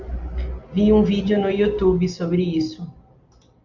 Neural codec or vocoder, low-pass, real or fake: none; 7.2 kHz; real